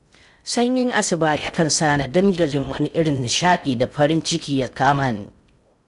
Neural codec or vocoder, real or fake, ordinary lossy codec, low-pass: codec, 16 kHz in and 24 kHz out, 0.6 kbps, FocalCodec, streaming, 2048 codes; fake; none; 10.8 kHz